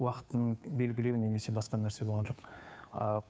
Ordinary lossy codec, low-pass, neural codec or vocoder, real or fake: none; none; codec, 16 kHz, 2 kbps, FunCodec, trained on Chinese and English, 25 frames a second; fake